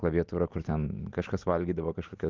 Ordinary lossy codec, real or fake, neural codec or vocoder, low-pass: Opus, 32 kbps; real; none; 7.2 kHz